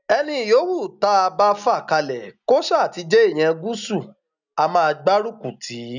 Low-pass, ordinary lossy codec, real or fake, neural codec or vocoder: 7.2 kHz; none; real; none